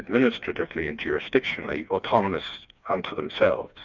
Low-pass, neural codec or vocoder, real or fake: 7.2 kHz; codec, 16 kHz, 2 kbps, FreqCodec, smaller model; fake